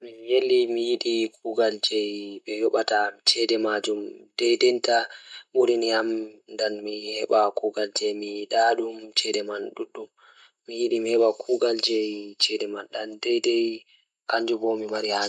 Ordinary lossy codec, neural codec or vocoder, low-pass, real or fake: none; none; none; real